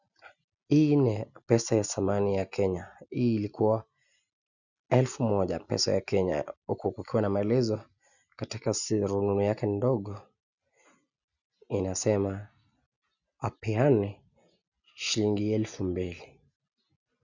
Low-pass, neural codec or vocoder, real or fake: 7.2 kHz; none; real